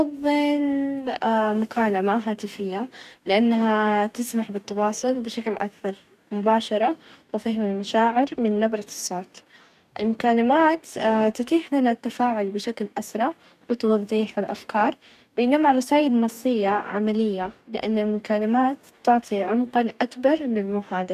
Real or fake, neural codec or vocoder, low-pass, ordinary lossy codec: fake; codec, 44.1 kHz, 2.6 kbps, DAC; 14.4 kHz; none